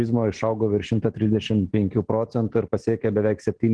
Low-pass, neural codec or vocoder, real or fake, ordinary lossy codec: 9.9 kHz; none; real; Opus, 16 kbps